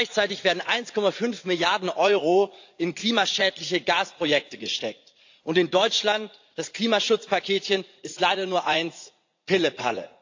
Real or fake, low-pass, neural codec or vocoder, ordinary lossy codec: fake; 7.2 kHz; vocoder, 44.1 kHz, 80 mel bands, Vocos; AAC, 48 kbps